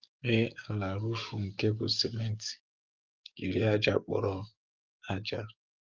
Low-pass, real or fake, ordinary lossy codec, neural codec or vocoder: 7.2 kHz; fake; Opus, 24 kbps; codec, 44.1 kHz, 7.8 kbps, DAC